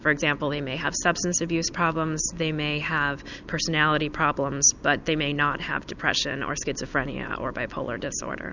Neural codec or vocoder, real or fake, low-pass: none; real; 7.2 kHz